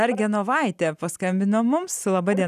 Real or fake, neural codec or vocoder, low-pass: real; none; 14.4 kHz